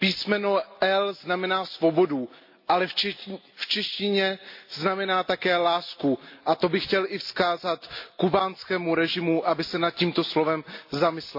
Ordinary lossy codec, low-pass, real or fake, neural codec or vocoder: MP3, 32 kbps; 5.4 kHz; real; none